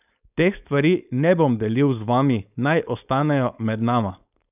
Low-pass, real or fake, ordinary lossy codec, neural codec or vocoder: 3.6 kHz; fake; none; codec, 16 kHz, 4.8 kbps, FACodec